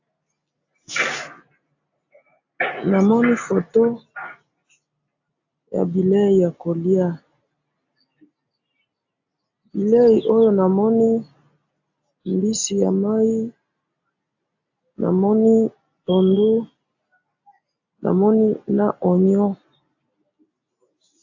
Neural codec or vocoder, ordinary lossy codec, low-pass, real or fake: none; AAC, 48 kbps; 7.2 kHz; real